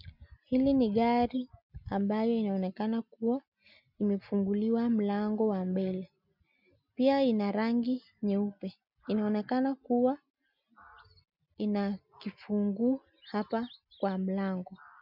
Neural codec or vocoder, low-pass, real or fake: none; 5.4 kHz; real